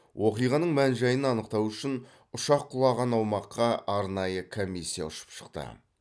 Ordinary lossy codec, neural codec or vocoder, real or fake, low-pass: none; none; real; none